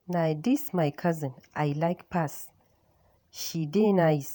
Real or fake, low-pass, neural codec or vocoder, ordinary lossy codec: fake; none; vocoder, 48 kHz, 128 mel bands, Vocos; none